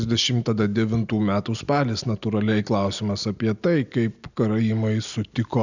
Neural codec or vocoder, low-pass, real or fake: none; 7.2 kHz; real